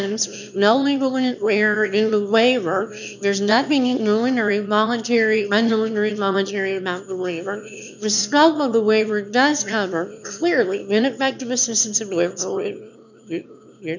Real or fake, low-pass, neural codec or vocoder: fake; 7.2 kHz; autoencoder, 22.05 kHz, a latent of 192 numbers a frame, VITS, trained on one speaker